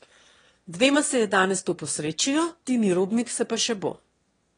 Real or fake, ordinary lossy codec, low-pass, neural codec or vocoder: fake; AAC, 32 kbps; 9.9 kHz; autoencoder, 22.05 kHz, a latent of 192 numbers a frame, VITS, trained on one speaker